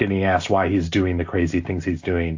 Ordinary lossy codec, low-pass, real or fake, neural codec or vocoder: AAC, 48 kbps; 7.2 kHz; real; none